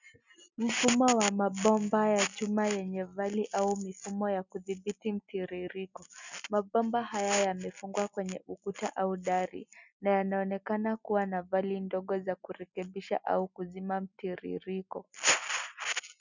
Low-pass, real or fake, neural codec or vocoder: 7.2 kHz; real; none